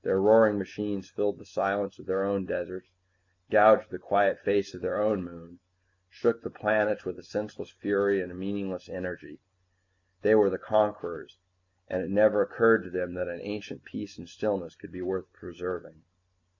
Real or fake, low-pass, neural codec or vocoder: real; 7.2 kHz; none